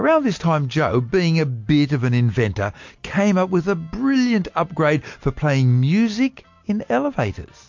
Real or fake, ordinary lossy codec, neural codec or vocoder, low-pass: real; MP3, 48 kbps; none; 7.2 kHz